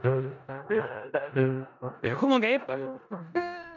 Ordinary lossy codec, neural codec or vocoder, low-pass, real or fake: none; codec, 16 kHz in and 24 kHz out, 0.4 kbps, LongCat-Audio-Codec, four codebook decoder; 7.2 kHz; fake